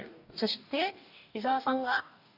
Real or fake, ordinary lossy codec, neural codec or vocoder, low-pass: fake; none; codec, 44.1 kHz, 2.6 kbps, DAC; 5.4 kHz